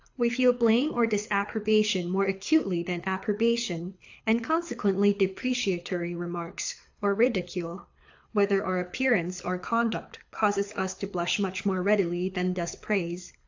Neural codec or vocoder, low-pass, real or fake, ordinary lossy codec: codec, 24 kHz, 6 kbps, HILCodec; 7.2 kHz; fake; AAC, 48 kbps